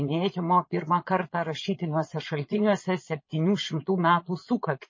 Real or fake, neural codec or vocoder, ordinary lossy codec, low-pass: fake; vocoder, 22.05 kHz, 80 mel bands, Vocos; MP3, 32 kbps; 7.2 kHz